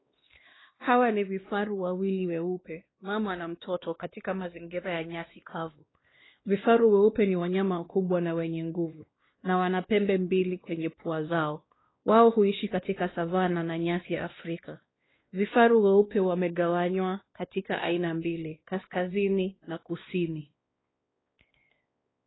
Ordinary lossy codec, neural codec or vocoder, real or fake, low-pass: AAC, 16 kbps; codec, 16 kHz, 2 kbps, X-Codec, WavLM features, trained on Multilingual LibriSpeech; fake; 7.2 kHz